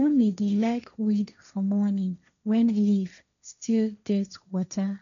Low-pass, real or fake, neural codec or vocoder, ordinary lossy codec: 7.2 kHz; fake; codec, 16 kHz, 1.1 kbps, Voila-Tokenizer; none